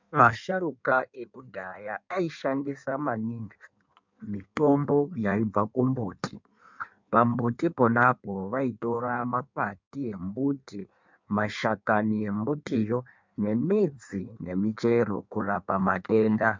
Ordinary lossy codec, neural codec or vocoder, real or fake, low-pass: MP3, 64 kbps; codec, 16 kHz in and 24 kHz out, 1.1 kbps, FireRedTTS-2 codec; fake; 7.2 kHz